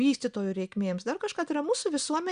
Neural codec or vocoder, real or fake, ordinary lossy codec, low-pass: none; real; Opus, 64 kbps; 9.9 kHz